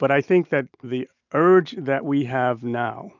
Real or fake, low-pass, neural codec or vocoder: real; 7.2 kHz; none